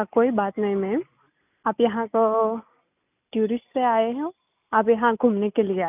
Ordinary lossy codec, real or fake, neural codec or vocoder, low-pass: none; real; none; 3.6 kHz